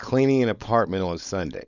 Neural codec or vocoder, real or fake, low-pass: codec, 16 kHz, 4.8 kbps, FACodec; fake; 7.2 kHz